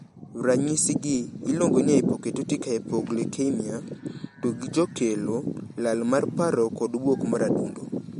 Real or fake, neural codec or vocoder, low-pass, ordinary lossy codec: real; none; 19.8 kHz; MP3, 48 kbps